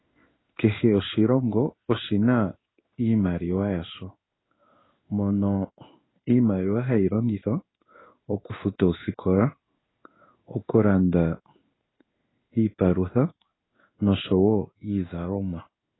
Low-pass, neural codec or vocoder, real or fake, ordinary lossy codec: 7.2 kHz; codec, 16 kHz in and 24 kHz out, 1 kbps, XY-Tokenizer; fake; AAC, 16 kbps